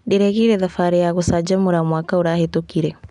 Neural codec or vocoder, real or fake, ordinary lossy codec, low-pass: none; real; none; 10.8 kHz